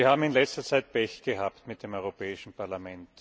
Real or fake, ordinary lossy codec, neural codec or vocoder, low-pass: real; none; none; none